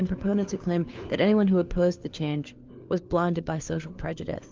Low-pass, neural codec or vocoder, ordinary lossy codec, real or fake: 7.2 kHz; codec, 16 kHz, 4 kbps, FunCodec, trained on LibriTTS, 50 frames a second; Opus, 24 kbps; fake